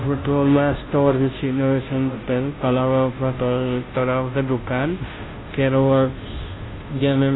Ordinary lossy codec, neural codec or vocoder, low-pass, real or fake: AAC, 16 kbps; codec, 16 kHz, 0.5 kbps, FunCodec, trained on Chinese and English, 25 frames a second; 7.2 kHz; fake